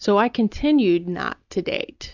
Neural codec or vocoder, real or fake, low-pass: none; real; 7.2 kHz